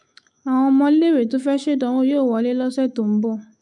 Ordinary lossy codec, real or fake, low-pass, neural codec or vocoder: none; fake; 10.8 kHz; autoencoder, 48 kHz, 128 numbers a frame, DAC-VAE, trained on Japanese speech